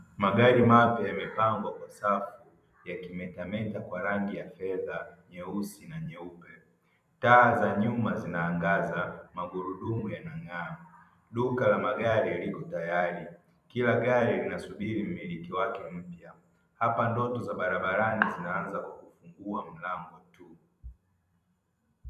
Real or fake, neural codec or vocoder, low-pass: fake; vocoder, 44.1 kHz, 128 mel bands every 256 samples, BigVGAN v2; 14.4 kHz